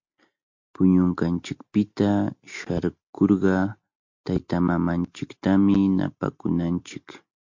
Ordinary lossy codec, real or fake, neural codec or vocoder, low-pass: MP3, 48 kbps; real; none; 7.2 kHz